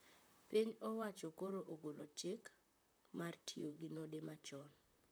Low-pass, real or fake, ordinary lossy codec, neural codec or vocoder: none; fake; none; vocoder, 44.1 kHz, 128 mel bands, Pupu-Vocoder